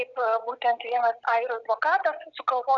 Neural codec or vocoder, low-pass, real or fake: none; 7.2 kHz; real